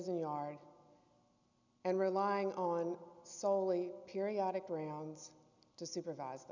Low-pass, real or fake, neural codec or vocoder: 7.2 kHz; real; none